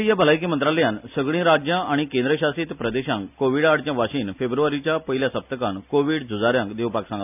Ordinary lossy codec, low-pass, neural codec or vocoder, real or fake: none; 3.6 kHz; none; real